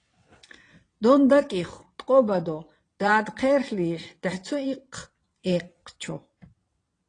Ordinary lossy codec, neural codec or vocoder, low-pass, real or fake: AAC, 32 kbps; vocoder, 22.05 kHz, 80 mel bands, WaveNeXt; 9.9 kHz; fake